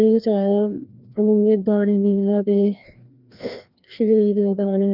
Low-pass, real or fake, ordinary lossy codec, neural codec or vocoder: 5.4 kHz; fake; Opus, 24 kbps; codec, 16 kHz, 1 kbps, FreqCodec, larger model